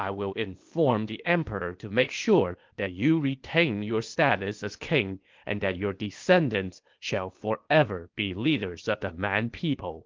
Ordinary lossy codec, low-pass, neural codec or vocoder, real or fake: Opus, 32 kbps; 7.2 kHz; codec, 16 kHz, 0.8 kbps, ZipCodec; fake